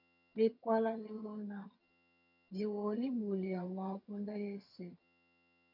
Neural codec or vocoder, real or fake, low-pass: vocoder, 22.05 kHz, 80 mel bands, HiFi-GAN; fake; 5.4 kHz